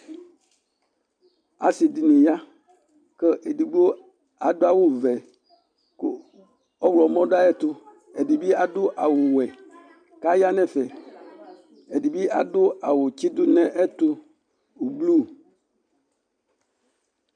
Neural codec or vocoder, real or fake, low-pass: none; real; 9.9 kHz